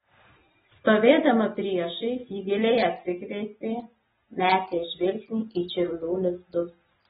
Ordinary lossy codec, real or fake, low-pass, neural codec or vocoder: AAC, 16 kbps; real; 19.8 kHz; none